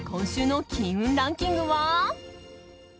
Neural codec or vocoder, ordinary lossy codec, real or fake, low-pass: none; none; real; none